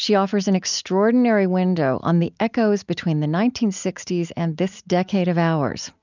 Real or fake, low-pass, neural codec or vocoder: real; 7.2 kHz; none